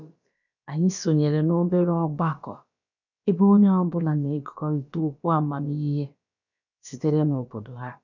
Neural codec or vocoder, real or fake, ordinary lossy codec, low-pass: codec, 16 kHz, about 1 kbps, DyCAST, with the encoder's durations; fake; none; 7.2 kHz